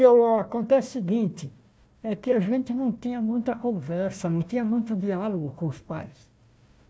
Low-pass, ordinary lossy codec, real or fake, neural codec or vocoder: none; none; fake; codec, 16 kHz, 1 kbps, FunCodec, trained on Chinese and English, 50 frames a second